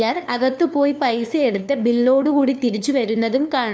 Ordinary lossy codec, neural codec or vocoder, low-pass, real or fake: none; codec, 16 kHz, 2 kbps, FunCodec, trained on LibriTTS, 25 frames a second; none; fake